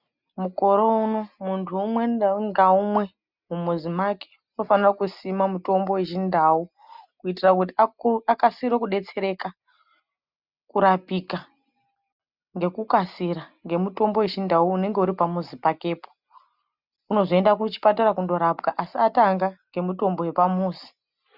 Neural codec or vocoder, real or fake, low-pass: none; real; 5.4 kHz